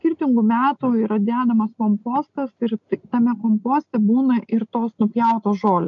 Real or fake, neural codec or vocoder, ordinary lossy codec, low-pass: real; none; MP3, 64 kbps; 7.2 kHz